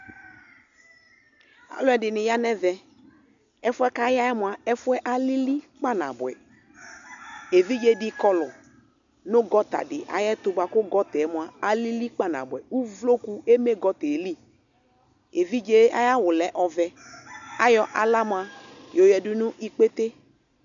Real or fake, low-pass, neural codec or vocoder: real; 7.2 kHz; none